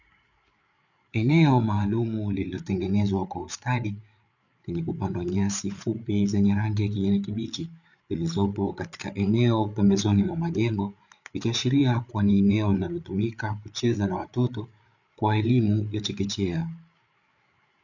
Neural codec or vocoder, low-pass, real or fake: codec, 16 kHz, 8 kbps, FreqCodec, larger model; 7.2 kHz; fake